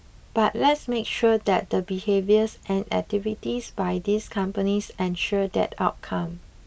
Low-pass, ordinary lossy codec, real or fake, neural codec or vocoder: none; none; real; none